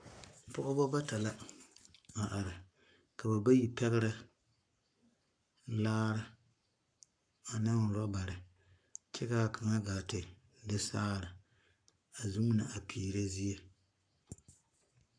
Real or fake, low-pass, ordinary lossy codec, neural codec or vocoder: fake; 9.9 kHz; AAC, 64 kbps; codec, 44.1 kHz, 7.8 kbps, Pupu-Codec